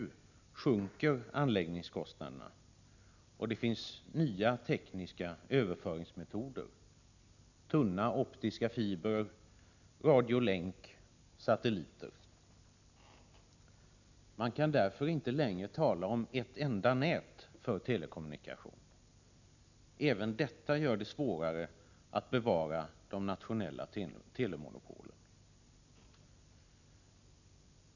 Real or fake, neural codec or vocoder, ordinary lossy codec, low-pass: real; none; none; 7.2 kHz